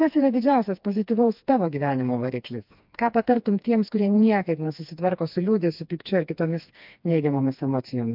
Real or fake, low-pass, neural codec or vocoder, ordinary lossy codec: fake; 5.4 kHz; codec, 16 kHz, 2 kbps, FreqCodec, smaller model; MP3, 48 kbps